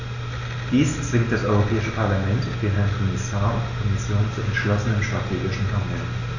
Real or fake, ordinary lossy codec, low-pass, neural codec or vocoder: real; none; 7.2 kHz; none